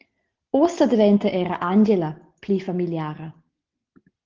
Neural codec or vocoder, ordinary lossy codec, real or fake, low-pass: none; Opus, 16 kbps; real; 7.2 kHz